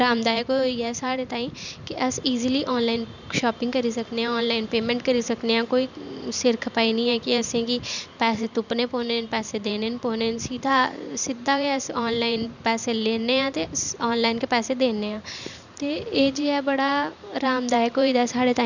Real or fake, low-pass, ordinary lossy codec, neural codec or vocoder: fake; 7.2 kHz; none; vocoder, 44.1 kHz, 128 mel bands every 512 samples, BigVGAN v2